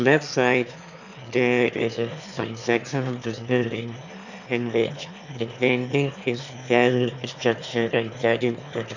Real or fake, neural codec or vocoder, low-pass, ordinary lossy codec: fake; autoencoder, 22.05 kHz, a latent of 192 numbers a frame, VITS, trained on one speaker; 7.2 kHz; none